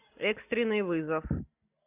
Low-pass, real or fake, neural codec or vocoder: 3.6 kHz; real; none